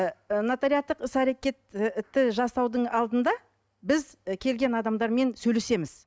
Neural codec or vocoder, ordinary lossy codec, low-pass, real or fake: none; none; none; real